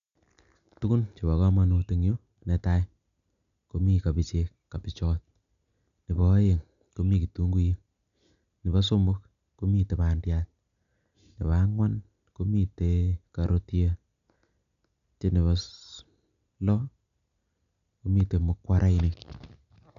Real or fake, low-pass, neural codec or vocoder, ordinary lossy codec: real; 7.2 kHz; none; none